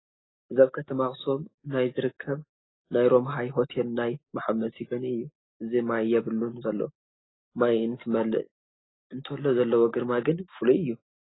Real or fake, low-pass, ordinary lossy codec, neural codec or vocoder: real; 7.2 kHz; AAC, 16 kbps; none